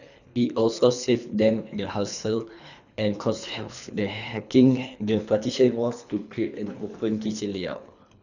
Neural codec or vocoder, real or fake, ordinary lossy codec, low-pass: codec, 24 kHz, 3 kbps, HILCodec; fake; none; 7.2 kHz